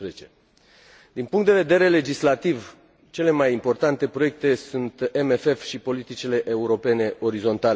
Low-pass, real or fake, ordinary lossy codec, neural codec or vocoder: none; real; none; none